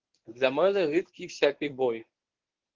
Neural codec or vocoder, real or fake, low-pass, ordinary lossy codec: codec, 24 kHz, 0.9 kbps, WavTokenizer, medium speech release version 1; fake; 7.2 kHz; Opus, 16 kbps